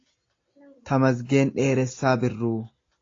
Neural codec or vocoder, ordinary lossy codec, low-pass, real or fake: none; AAC, 32 kbps; 7.2 kHz; real